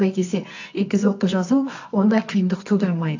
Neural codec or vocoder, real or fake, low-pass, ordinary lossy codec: codec, 24 kHz, 0.9 kbps, WavTokenizer, medium music audio release; fake; 7.2 kHz; AAC, 48 kbps